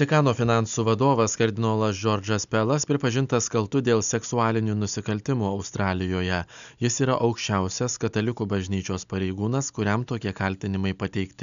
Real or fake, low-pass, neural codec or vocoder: real; 7.2 kHz; none